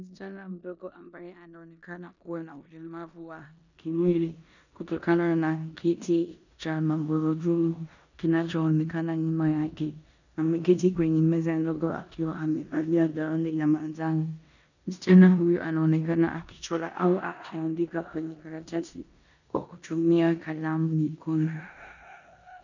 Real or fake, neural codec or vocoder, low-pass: fake; codec, 16 kHz in and 24 kHz out, 0.9 kbps, LongCat-Audio-Codec, four codebook decoder; 7.2 kHz